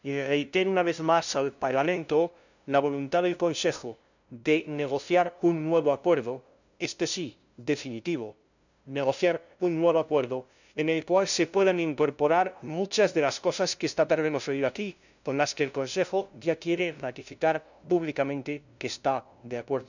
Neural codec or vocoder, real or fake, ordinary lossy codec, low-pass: codec, 16 kHz, 0.5 kbps, FunCodec, trained on LibriTTS, 25 frames a second; fake; none; 7.2 kHz